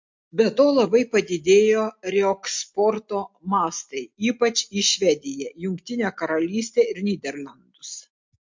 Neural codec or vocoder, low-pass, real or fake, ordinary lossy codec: none; 7.2 kHz; real; MP3, 48 kbps